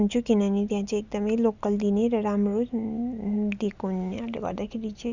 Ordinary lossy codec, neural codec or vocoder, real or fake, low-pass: Opus, 64 kbps; none; real; 7.2 kHz